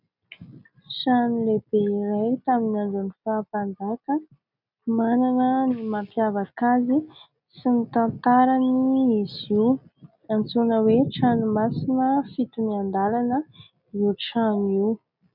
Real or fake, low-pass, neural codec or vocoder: real; 5.4 kHz; none